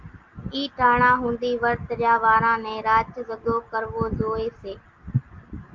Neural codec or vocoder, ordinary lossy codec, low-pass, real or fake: none; Opus, 32 kbps; 7.2 kHz; real